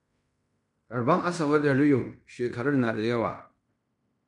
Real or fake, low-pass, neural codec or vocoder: fake; 10.8 kHz; codec, 16 kHz in and 24 kHz out, 0.9 kbps, LongCat-Audio-Codec, fine tuned four codebook decoder